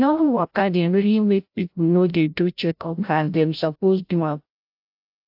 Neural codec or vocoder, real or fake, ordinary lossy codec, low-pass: codec, 16 kHz, 0.5 kbps, FreqCodec, larger model; fake; AAC, 48 kbps; 5.4 kHz